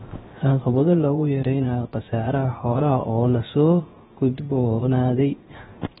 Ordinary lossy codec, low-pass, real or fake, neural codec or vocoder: AAC, 16 kbps; 7.2 kHz; fake; codec, 16 kHz, 0.7 kbps, FocalCodec